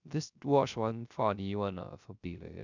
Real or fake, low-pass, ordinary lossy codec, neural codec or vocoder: fake; 7.2 kHz; none; codec, 16 kHz, 0.3 kbps, FocalCodec